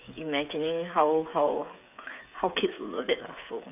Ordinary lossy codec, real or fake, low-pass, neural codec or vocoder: none; fake; 3.6 kHz; codec, 16 kHz, 8 kbps, FreqCodec, smaller model